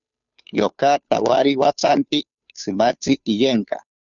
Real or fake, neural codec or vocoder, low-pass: fake; codec, 16 kHz, 2 kbps, FunCodec, trained on Chinese and English, 25 frames a second; 7.2 kHz